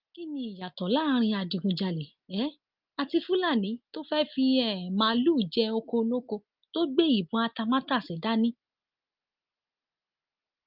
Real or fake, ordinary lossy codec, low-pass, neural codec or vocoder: real; Opus, 24 kbps; 5.4 kHz; none